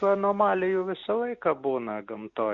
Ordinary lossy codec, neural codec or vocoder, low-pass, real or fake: Opus, 64 kbps; none; 7.2 kHz; real